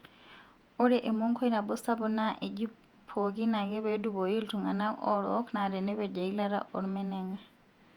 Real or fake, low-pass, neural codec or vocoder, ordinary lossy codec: fake; 19.8 kHz; vocoder, 48 kHz, 128 mel bands, Vocos; Opus, 64 kbps